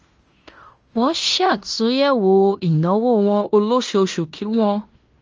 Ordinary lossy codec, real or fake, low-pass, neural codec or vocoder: Opus, 24 kbps; fake; 7.2 kHz; codec, 16 kHz in and 24 kHz out, 0.9 kbps, LongCat-Audio-Codec, fine tuned four codebook decoder